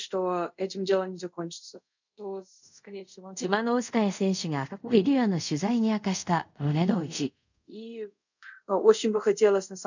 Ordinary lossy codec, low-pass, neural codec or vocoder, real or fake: none; 7.2 kHz; codec, 24 kHz, 0.5 kbps, DualCodec; fake